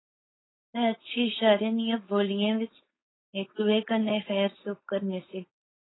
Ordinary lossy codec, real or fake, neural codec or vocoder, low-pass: AAC, 16 kbps; fake; codec, 16 kHz, 4.8 kbps, FACodec; 7.2 kHz